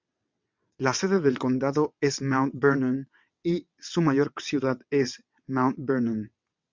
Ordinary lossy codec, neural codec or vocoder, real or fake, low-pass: MP3, 64 kbps; vocoder, 22.05 kHz, 80 mel bands, WaveNeXt; fake; 7.2 kHz